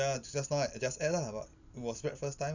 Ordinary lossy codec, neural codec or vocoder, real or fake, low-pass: none; none; real; 7.2 kHz